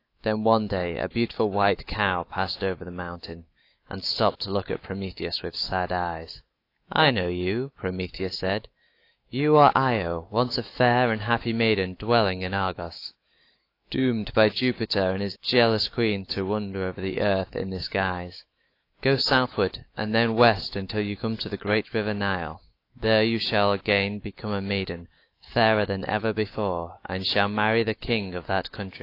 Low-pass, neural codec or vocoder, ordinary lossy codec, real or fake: 5.4 kHz; autoencoder, 48 kHz, 128 numbers a frame, DAC-VAE, trained on Japanese speech; AAC, 32 kbps; fake